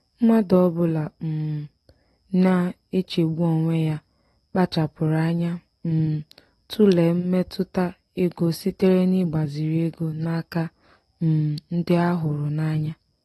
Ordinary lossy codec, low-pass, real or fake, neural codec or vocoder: AAC, 32 kbps; 19.8 kHz; real; none